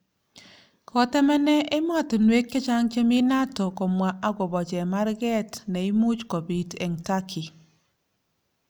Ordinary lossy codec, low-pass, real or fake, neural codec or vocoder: none; none; real; none